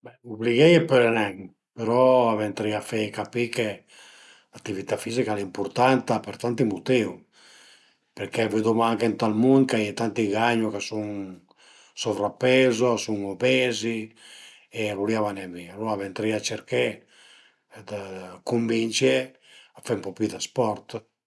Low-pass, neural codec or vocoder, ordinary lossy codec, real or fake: none; none; none; real